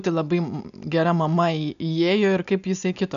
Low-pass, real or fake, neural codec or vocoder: 7.2 kHz; real; none